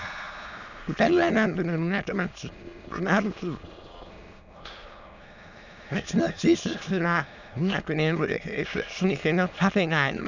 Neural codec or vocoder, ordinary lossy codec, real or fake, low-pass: autoencoder, 22.05 kHz, a latent of 192 numbers a frame, VITS, trained on many speakers; none; fake; 7.2 kHz